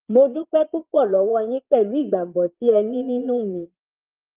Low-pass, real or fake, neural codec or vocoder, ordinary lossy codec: 3.6 kHz; fake; vocoder, 22.05 kHz, 80 mel bands, Vocos; Opus, 24 kbps